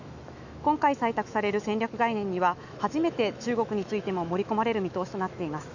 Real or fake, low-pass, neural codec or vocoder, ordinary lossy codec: fake; 7.2 kHz; autoencoder, 48 kHz, 128 numbers a frame, DAC-VAE, trained on Japanese speech; none